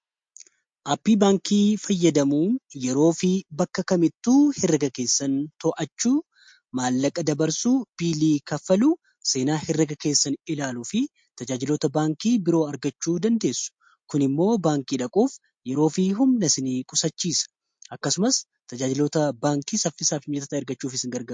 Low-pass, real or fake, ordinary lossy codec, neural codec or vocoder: 9.9 kHz; real; MP3, 48 kbps; none